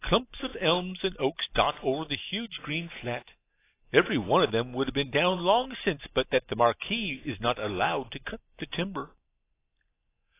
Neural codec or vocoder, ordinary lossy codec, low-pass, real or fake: none; AAC, 24 kbps; 3.6 kHz; real